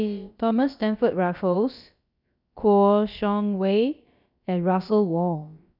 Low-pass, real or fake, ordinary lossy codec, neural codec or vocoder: 5.4 kHz; fake; none; codec, 16 kHz, about 1 kbps, DyCAST, with the encoder's durations